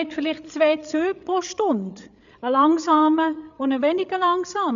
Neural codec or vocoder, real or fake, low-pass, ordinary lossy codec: codec, 16 kHz, 8 kbps, FreqCodec, larger model; fake; 7.2 kHz; MP3, 96 kbps